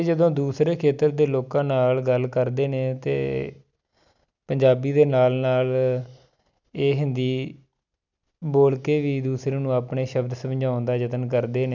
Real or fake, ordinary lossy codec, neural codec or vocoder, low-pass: real; none; none; 7.2 kHz